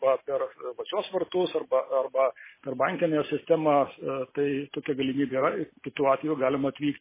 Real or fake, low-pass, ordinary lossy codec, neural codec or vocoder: real; 3.6 kHz; MP3, 16 kbps; none